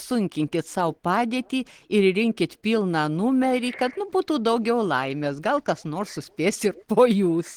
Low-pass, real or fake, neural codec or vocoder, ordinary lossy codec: 19.8 kHz; real; none; Opus, 16 kbps